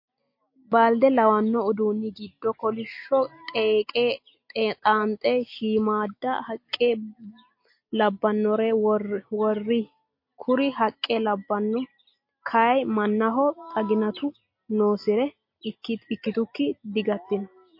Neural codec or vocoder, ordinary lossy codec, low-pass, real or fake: none; MP3, 32 kbps; 5.4 kHz; real